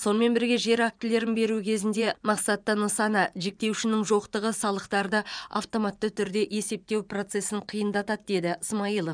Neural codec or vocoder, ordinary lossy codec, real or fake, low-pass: vocoder, 24 kHz, 100 mel bands, Vocos; none; fake; 9.9 kHz